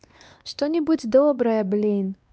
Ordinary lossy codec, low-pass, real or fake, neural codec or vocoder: none; none; fake; codec, 16 kHz, 2 kbps, X-Codec, WavLM features, trained on Multilingual LibriSpeech